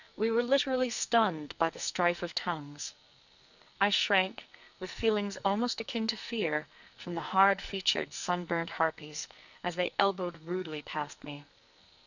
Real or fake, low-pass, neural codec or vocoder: fake; 7.2 kHz; codec, 44.1 kHz, 2.6 kbps, SNAC